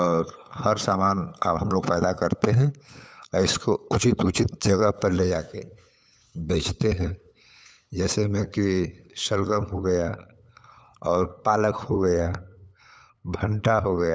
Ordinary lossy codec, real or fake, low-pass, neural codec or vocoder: none; fake; none; codec, 16 kHz, 8 kbps, FunCodec, trained on LibriTTS, 25 frames a second